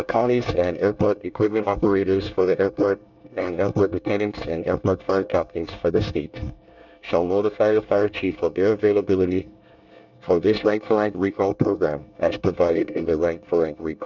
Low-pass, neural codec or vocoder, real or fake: 7.2 kHz; codec, 24 kHz, 1 kbps, SNAC; fake